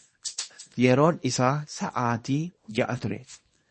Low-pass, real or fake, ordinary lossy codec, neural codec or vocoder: 10.8 kHz; fake; MP3, 32 kbps; codec, 24 kHz, 0.9 kbps, WavTokenizer, small release